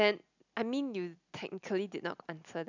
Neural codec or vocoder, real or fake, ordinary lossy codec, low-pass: none; real; none; 7.2 kHz